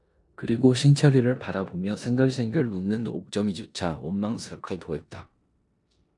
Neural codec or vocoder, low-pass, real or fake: codec, 16 kHz in and 24 kHz out, 0.9 kbps, LongCat-Audio-Codec, four codebook decoder; 10.8 kHz; fake